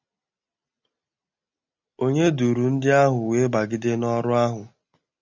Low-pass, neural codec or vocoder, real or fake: 7.2 kHz; none; real